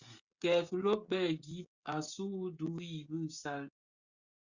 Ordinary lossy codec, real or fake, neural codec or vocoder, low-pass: Opus, 64 kbps; fake; codec, 16 kHz, 16 kbps, FreqCodec, smaller model; 7.2 kHz